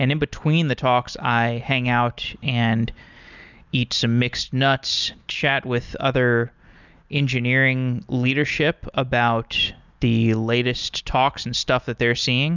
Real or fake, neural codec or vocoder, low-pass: real; none; 7.2 kHz